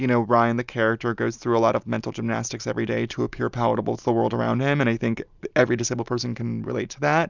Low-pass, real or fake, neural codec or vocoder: 7.2 kHz; real; none